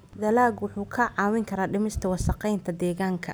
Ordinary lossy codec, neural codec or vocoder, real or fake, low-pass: none; none; real; none